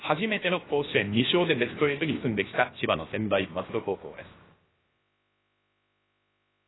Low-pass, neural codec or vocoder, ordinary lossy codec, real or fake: 7.2 kHz; codec, 16 kHz, about 1 kbps, DyCAST, with the encoder's durations; AAC, 16 kbps; fake